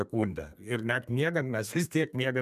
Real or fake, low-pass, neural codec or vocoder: fake; 14.4 kHz; codec, 32 kHz, 1.9 kbps, SNAC